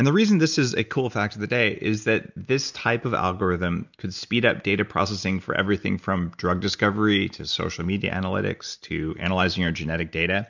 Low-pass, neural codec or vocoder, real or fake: 7.2 kHz; none; real